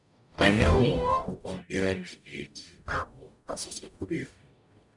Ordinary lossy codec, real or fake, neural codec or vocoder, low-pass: none; fake; codec, 44.1 kHz, 0.9 kbps, DAC; 10.8 kHz